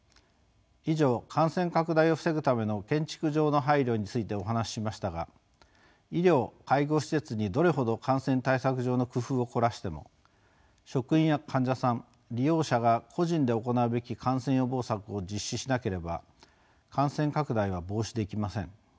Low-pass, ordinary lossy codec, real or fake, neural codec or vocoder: none; none; real; none